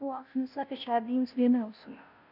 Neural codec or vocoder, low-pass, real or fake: codec, 16 kHz, 0.5 kbps, FunCodec, trained on Chinese and English, 25 frames a second; 5.4 kHz; fake